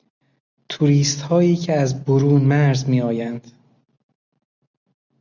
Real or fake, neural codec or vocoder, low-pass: real; none; 7.2 kHz